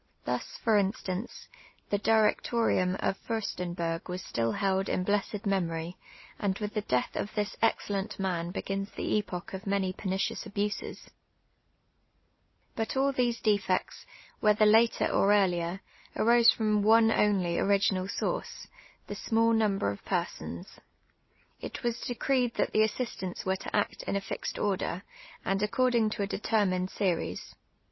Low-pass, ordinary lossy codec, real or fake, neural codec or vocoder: 7.2 kHz; MP3, 24 kbps; real; none